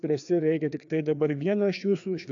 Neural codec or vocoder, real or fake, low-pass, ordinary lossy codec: codec, 16 kHz, 2 kbps, FreqCodec, larger model; fake; 7.2 kHz; AAC, 64 kbps